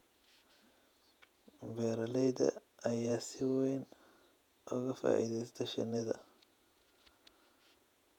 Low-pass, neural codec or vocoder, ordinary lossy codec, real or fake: 19.8 kHz; vocoder, 48 kHz, 128 mel bands, Vocos; none; fake